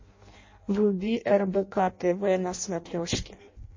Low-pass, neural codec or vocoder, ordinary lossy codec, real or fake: 7.2 kHz; codec, 16 kHz in and 24 kHz out, 0.6 kbps, FireRedTTS-2 codec; MP3, 32 kbps; fake